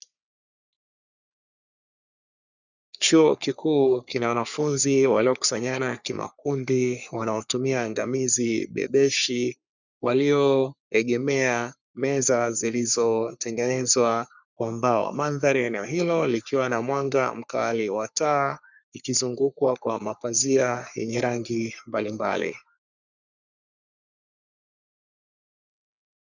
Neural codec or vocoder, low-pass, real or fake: codec, 44.1 kHz, 3.4 kbps, Pupu-Codec; 7.2 kHz; fake